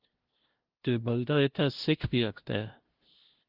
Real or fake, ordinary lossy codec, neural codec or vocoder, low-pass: fake; Opus, 16 kbps; codec, 16 kHz, 0.5 kbps, FunCodec, trained on LibriTTS, 25 frames a second; 5.4 kHz